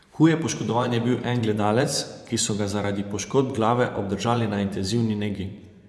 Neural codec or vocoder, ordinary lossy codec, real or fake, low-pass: vocoder, 24 kHz, 100 mel bands, Vocos; none; fake; none